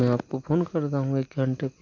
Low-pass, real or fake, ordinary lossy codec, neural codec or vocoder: 7.2 kHz; real; none; none